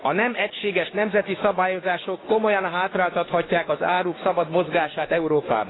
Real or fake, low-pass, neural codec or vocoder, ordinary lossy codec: fake; 7.2 kHz; codec, 16 kHz, 6 kbps, DAC; AAC, 16 kbps